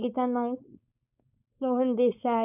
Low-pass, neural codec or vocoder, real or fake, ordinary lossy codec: 3.6 kHz; codec, 16 kHz, 4.8 kbps, FACodec; fake; none